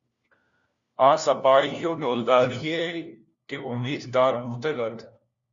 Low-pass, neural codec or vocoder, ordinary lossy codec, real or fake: 7.2 kHz; codec, 16 kHz, 1 kbps, FunCodec, trained on LibriTTS, 50 frames a second; Opus, 64 kbps; fake